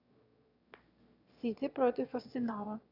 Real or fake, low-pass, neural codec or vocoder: fake; 5.4 kHz; autoencoder, 22.05 kHz, a latent of 192 numbers a frame, VITS, trained on one speaker